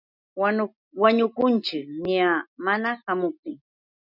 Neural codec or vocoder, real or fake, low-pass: none; real; 5.4 kHz